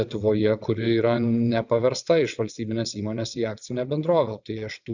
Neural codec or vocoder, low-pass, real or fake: vocoder, 22.05 kHz, 80 mel bands, WaveNeXt; 7.2 kHz; fake